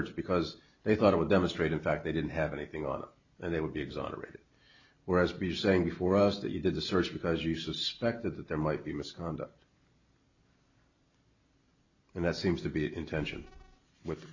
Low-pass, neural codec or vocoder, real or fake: 7.2 kHz; none; real